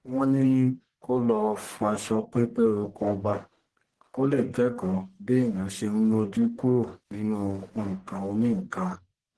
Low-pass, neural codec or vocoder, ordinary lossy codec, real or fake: 10.8 kHz; codec, 44.1 kHz, 1.7 kbps, Pupu-Codec; Opus, 16 kbps; fake